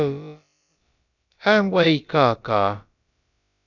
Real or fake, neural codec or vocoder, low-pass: fake; codec, 16 kHz, about 1 kbps, DyCAST, with the encoder's durations; 7.2 kHz